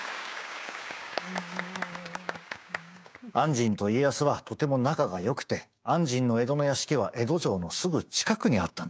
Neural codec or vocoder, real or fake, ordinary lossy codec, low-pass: codec, 16 kHz, 6 kbps, DAC; fake; none; none